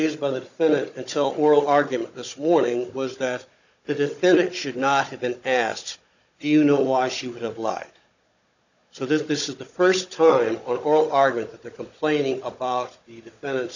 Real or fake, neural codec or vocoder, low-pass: fake; codec, 16 kHz, 16 kbps, FunCodec, trained on Chinese and English, 50 frames a second; 7.2 kHz